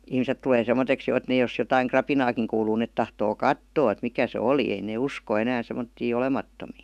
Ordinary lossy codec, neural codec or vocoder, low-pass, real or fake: none; autoencoder, 48 kHz, 128 numbers a frame, DAC-VAE, trained on Japanese speech; 14.4 kHz; fake